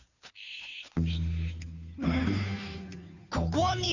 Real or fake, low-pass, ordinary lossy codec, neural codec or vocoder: fake; 7.2 kHz; none; codec, 16 kHz, 2 kbps, FunCodec, trained on Chinese and English, 25 frames a second